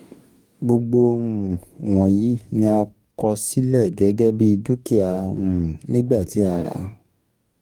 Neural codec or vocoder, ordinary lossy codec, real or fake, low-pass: codec, 44.1 kHz, 2.6 kbps, DAC; Opus, 32 kbps; fake; 19.8 kHz